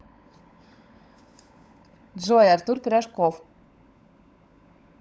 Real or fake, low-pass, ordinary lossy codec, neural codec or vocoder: fake; none; none; codec, 16 kHz, 16 kbps, FunCodec, trained on LibriTTS, 50 frames a second